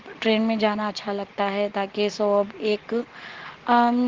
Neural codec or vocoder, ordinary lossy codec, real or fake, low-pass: none; Opus, 16 kbps; real; 7.2 kHz